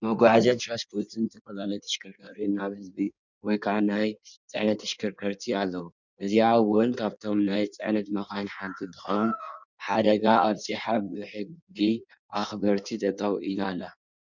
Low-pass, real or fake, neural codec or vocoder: 7.2 kHz; fake; codec, 16 kHz in and 24 kHz out, 1.1 kbps, FireRedTTS-2 codec